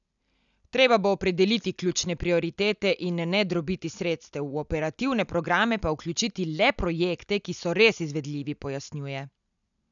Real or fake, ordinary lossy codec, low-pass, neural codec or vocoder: real; none; 7.2 kHz; none